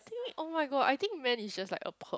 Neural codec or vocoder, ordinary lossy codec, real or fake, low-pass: none; none; real; none